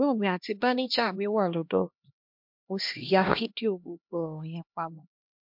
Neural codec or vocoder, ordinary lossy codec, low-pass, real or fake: codec, 16 kHz, 1 kbps, X-Codec, HuBERT features, trained on LibriSpeech; MP3, 48 kbps; 5.4 kHz; fake